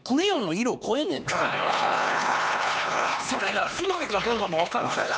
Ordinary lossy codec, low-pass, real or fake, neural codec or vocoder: none; none; fake; codec, 16 kHz, 2 kbps, X-Codec, HuBERT features, trained on LibriSpeech